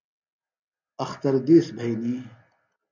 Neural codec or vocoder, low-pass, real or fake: none; 7.2 kHz; real